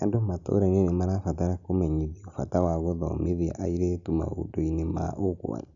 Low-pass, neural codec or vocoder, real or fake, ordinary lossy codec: 7.2 kHz; none; real; none